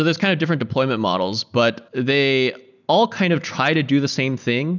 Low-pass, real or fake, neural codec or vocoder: 7.2 kHz; real; none